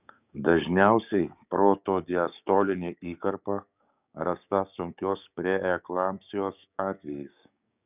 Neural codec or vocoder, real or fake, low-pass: codec, 16 kHz, 6 kbps, DAC; fake; 3.6 kHz